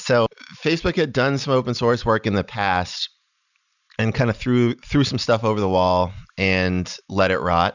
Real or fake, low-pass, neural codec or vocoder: real; 7.2 kHz; none